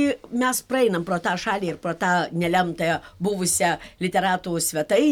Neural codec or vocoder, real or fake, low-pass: none; real; 19.8 kHz